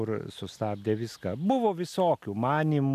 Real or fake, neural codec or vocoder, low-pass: real; none; 14.4 kHz